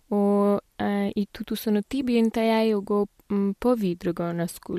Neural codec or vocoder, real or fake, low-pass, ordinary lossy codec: none; real; 14.4 kHz; MP3, 64 kbps